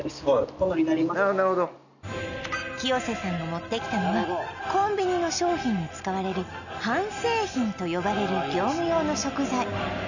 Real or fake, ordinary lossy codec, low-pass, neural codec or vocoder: real; none; 7.2 kHz; none